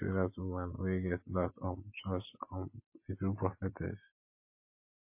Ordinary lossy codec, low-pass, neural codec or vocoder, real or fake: MP3, 24 kbps; 3.6 kHz; none; real